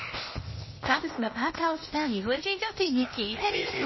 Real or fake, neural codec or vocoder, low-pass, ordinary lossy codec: fake; codec, 16 kHz, 0.8 kbps, ZipCodec; 7.2 kHz; MP3, 24 kbps